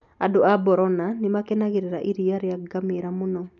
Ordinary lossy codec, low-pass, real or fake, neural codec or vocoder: none; 7.2 kHz; real; none